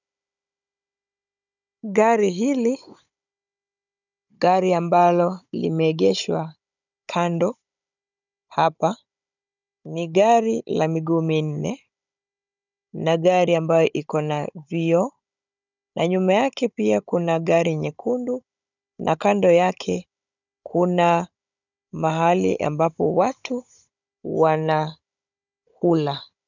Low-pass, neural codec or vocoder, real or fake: 7.2 kHz; codec, 16 kHz, 16 kbps, FunCodec, trained on Chinese and English, 50 frames a second; fake